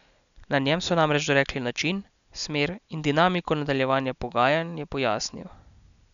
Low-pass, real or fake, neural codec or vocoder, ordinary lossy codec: 7.2 kHz; real; none; none